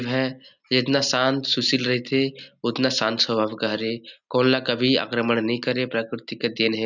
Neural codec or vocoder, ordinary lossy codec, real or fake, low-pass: none; none; real; 7.2 kHz